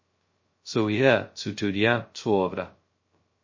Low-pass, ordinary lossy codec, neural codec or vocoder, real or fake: 7.2 kHz; MP3, 32 kbps; codec, 16 kHz, 0.2 kbps, FocalCodec; fake